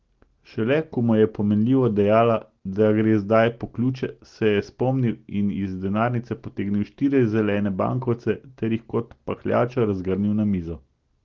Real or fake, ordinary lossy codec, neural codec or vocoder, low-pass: real; Opus, 16 kbps; none; 7.2 kHz